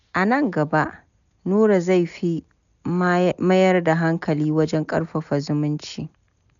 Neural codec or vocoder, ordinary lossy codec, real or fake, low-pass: none; none; real; 7.2 kHz